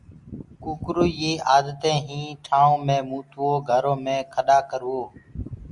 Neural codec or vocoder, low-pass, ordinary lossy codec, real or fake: none; 10.8 kHz; Opus, 64 kbps; real